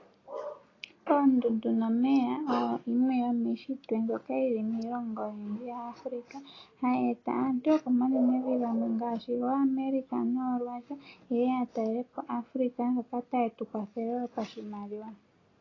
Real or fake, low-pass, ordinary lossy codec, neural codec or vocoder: real; 7.2 kHz; AAC, 32 kbps; none